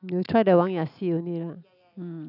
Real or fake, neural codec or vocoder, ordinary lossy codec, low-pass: real; none; none; 5.4 kHz